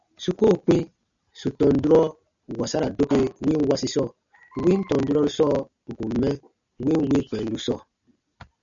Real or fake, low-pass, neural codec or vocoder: real; 7.2 kHz; none